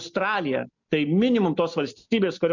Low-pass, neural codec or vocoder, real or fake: 7.2 kHz; none; real